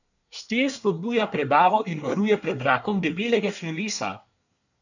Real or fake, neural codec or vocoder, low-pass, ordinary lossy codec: fake; codec, 24 kHz, 1 kbps, SNAC; 7.2 kHz; none